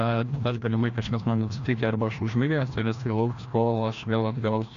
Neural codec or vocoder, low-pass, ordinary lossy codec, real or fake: codec, 16 kHz, 1 kbps, FreqCodec, larger model; 7.2 kHz; AAC, 48 kbps; fake